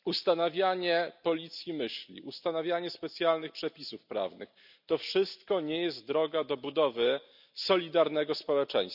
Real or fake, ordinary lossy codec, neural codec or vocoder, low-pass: real; none; none; 5.4 kHz